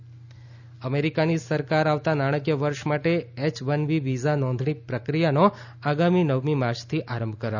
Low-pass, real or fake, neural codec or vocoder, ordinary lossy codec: 7.2 kHz; real; none; none